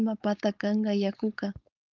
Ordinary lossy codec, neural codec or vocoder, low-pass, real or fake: Opus, 24 kbps; codec, 16 kHz, 4.8 kbps, FACodec; 7.2 kHz; fake